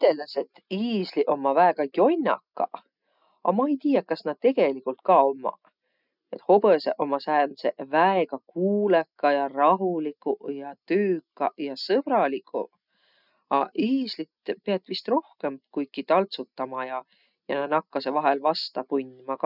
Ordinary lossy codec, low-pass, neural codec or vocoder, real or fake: none; 5.4 kHz; none; real